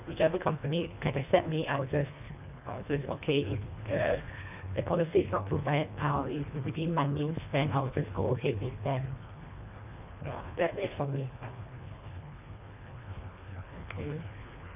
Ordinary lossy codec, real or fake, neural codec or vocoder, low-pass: none; fake; codec, 24 kHz, 1.5 kbps, HILCodec; 3.6 kHz